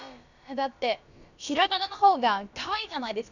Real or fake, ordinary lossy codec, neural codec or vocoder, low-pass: fake; none; codec, 16 kHz, about 1 kbps, DyCAST, with the encoder's durations; 7.2 kHz